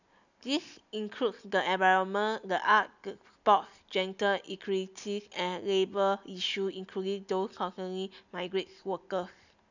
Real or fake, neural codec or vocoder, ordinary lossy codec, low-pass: real; none; none; 7.2 kHz